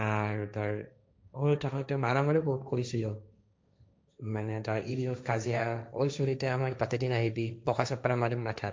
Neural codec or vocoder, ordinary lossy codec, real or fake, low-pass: codec, 16 kHz, 1.1 kbps, Voila-Tokenizer; none; fake; none